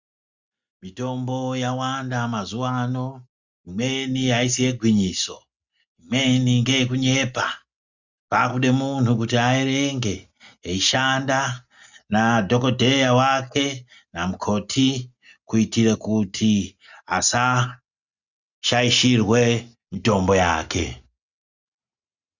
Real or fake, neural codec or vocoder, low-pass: real; none; 7.2 kHz